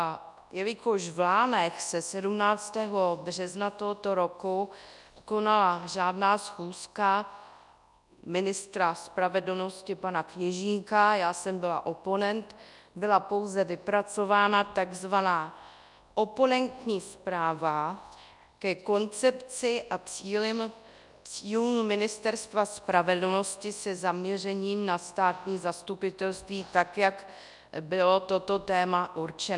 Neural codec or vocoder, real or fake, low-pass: codec, 24 kHz, 0.9 kbps, WavTokenizer, large speech release; fake; 10.8 kHz